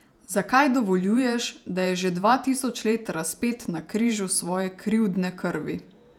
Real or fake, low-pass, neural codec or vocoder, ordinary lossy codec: fake; 19.8 kHz; vocoder, 48 kHz, 128 mel bands, Vocos; none